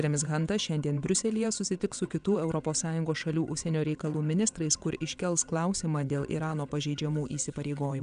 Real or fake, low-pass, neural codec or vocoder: fake; 9.9 kHz; vocoder, 22.05 kHz, 80 mel bands, WaveNeXt